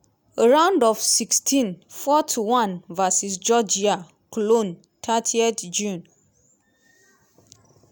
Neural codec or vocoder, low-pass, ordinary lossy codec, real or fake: none; none; none; real